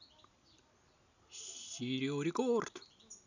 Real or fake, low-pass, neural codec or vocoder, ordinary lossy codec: real; 7.2 kHz; none; none